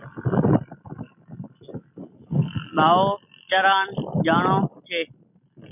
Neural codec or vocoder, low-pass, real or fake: none; 3.6 kHz; real